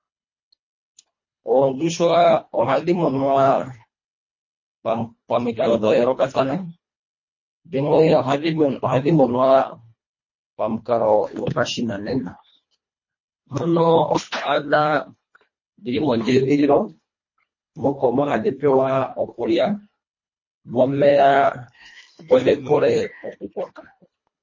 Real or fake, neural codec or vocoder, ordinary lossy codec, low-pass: fake; codec, 24 kHz, 1.5 kbps, HILCodec; MP3, 32 kbps; 7.2 kHz